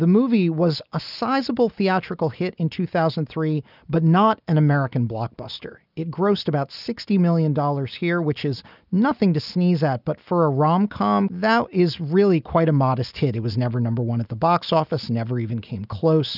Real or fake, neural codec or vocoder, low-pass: real; none; 5.4 kHz